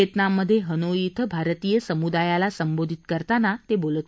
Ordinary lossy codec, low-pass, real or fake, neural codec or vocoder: none; none; real; none